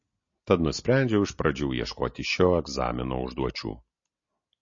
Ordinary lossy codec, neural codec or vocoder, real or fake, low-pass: MP3, 32 kbps; none; real; 7.2 kHz